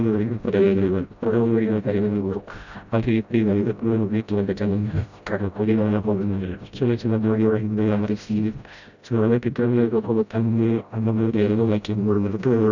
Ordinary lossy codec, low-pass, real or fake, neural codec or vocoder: none; 7.2 kHz; fake; codec, 16 kHz, 0.5 kbps, FreqCodec, smaller model